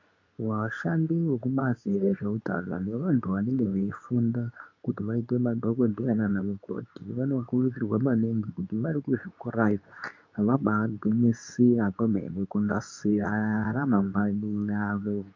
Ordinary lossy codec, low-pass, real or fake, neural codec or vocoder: MP3, 64 kbps; 7.2 kHz; fake; codec, 24 kHz, 0.9 kbps, WavTokenizer, medium speech release version 2